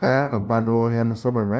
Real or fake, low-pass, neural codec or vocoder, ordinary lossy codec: fake; none; codec, 16 kHz, 0.5 kbps, FunCodec, trained on LibriTTS, 25 frames a second; none